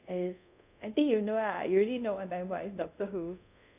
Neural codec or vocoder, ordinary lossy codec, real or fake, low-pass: codec, 24 kHz, 0.5 kbps, DualCodec; none; fake; 3.6 kHz